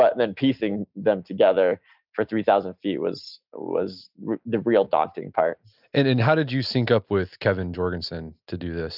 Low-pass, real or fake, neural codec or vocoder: 5.4 kHz; real; none